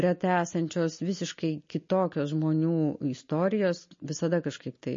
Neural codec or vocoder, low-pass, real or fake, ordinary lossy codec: none; 7.2 kHz; real; MP3, 32 kbps